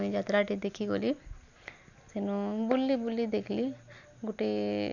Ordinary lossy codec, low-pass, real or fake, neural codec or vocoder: none; 7.2 kHz; real; none